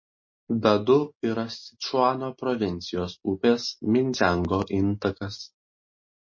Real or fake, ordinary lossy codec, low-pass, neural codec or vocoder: real; MP3, 32 kbps; 7.2 kHz; none